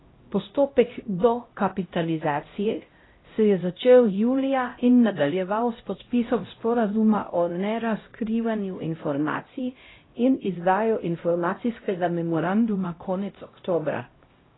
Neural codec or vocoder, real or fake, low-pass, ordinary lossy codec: codec, 16 kHz, 0.5 kbps, X-Codec, HuBERT features, trained on LibriSpeech; fake; 7.2 kHz; AAC, 16 kbps